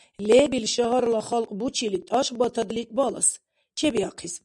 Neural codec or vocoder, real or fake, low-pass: none; real; 10.8 kHz